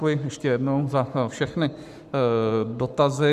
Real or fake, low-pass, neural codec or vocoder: fake; 14.4 kHz; codec, 44.1 kHz, 7.8 kbps, Pupu-Codec